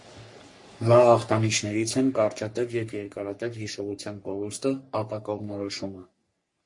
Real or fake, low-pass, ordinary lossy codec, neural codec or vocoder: fake; 10.8 kHz; MP3, 48 kbps; codec, 44.1 kHz, 3.4 kbps, Pupu-Codec